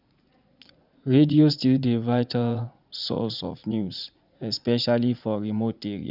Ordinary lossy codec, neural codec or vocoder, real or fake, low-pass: none; vocoder, 44.1 kHz, 80 mel bands, Vocos; fake; 5.4 kHz